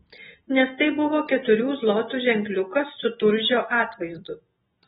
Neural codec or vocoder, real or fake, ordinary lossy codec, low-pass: none; real; AAC, 16 kbps; 10.8 kHz